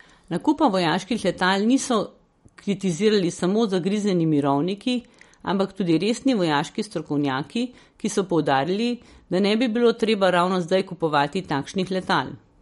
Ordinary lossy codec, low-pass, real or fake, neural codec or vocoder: MP3, 48 kbps; 19.8 kHz; real; none